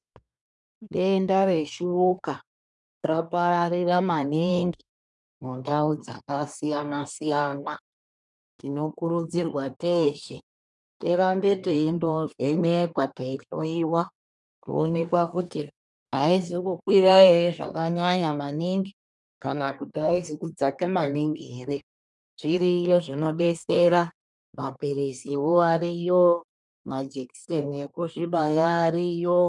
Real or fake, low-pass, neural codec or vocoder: fake; 10.8 kHz; codec, 24 kHz, 1 kbps, SNAC